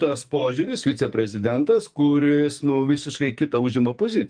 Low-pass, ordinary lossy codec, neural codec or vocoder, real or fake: 9.9 kHz; Opus, 32 kbps; codec, 44.1 kHz, 2.6 kbps, SNAC; fake